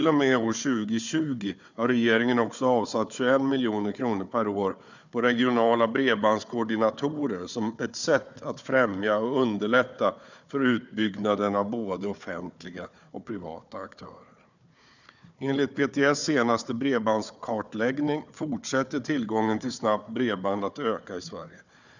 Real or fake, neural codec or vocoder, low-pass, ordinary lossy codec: fake; codec, 16 kHz, 4 kbps, FunCodec, trained on Chinese and English, 50 frames a second; 7.2 kHz; none